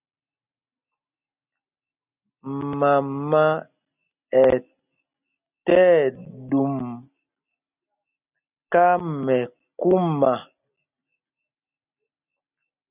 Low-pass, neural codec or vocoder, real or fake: 3.6 kHz; none; real